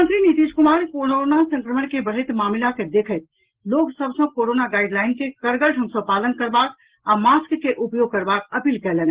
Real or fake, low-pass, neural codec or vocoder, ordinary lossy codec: real; 3.6 kHz; none; Opus, 16 kbps